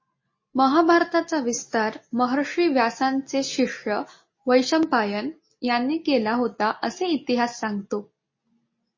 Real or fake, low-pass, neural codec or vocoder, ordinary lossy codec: real; 7.2 kHz; none; MP3, 32 kbps